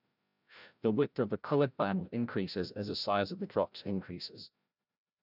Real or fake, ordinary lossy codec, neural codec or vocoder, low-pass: fake; none; codec, 16 kHz, 0.5 kbps, FreqCodec, larger model; 5.4 kHz